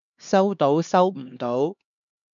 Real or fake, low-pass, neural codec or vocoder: fake; 7.2 kHz; codec, 16 kHz, 2 kbps, X-Codec, HuBERT features, trained on LibriSpeech